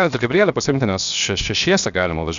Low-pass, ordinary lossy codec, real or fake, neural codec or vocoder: 7.2 kHz; Opus, 64 kbps; fake; codec, 16 kHz, 0.7 kbps, FocalCodec